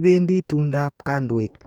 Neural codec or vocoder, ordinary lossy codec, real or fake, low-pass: codec, 44.1 kHz, 2.6 kbps, DAC; none; fake; 19.8 kHz